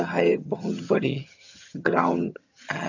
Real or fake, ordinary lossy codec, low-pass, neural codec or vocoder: fake; none; 7.2 kHz; vocoder, 22.05 kHz, 80 mel bands, HiFi-GAN